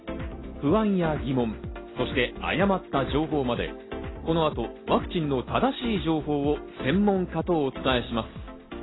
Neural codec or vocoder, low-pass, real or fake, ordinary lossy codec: none; 7.2 kHz; real; AAC, 16 kbps